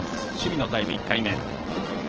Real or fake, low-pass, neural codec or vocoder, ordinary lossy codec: fake; 7.2 kHz; vocoder, 22.05 kHz, 80 mel bands, Vocos; Opus, 16 kbps